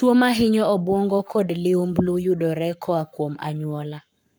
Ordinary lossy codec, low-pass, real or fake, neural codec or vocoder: none; none; fake; codec, 44.1 kHz, 7.8 kbps, Pupu-Codec